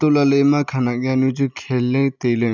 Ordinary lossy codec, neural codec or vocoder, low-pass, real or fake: none; none; 7.2 kHz; real